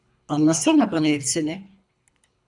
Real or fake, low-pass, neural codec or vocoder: fake; 10.8 kHz; codec, 24 kHz, 3 kbps, HILCodec